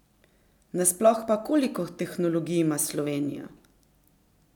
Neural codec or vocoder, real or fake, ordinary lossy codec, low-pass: none; real; none; 19.8 kHz